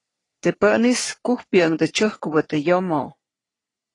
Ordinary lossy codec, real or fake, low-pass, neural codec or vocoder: AAC, 32 kbps; fake; 10.8 kHz; codec, 44.1 kHz, 3.4 kbps, Pupu-Codec